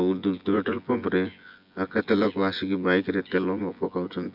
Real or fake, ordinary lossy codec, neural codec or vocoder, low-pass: fake; none; vocoder, 24 kHz, 100 mel bands, Vocos; 5.4 kHz